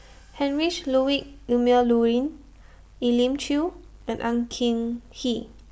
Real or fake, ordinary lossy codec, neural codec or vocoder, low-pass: real; none; none; none